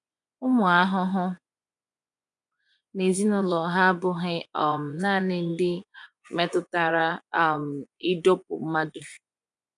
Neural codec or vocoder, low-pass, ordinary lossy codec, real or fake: vocoder, 24 kHz, 100 mel bands, Vocos; 10.8 kHz; none; fake